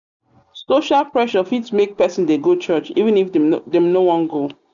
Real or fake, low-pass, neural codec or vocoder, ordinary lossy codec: real; 7.2 kHz; none; none